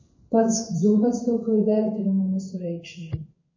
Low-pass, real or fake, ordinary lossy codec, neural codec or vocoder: 7.2 kHz; fake; MP3, 32 kbps; codec, 16 kHz in and 24 kHz out, 1 kbps, XY-Tokenizer